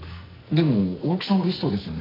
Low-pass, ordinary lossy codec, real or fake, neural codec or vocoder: 5.4 kHz; AAC, 24 kbps; fake; codec, 44.1 kHz, 2.6 kbps, SNAC